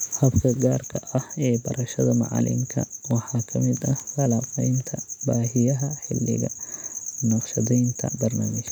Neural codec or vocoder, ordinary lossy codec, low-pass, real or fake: none; none; 19.8 kHz; real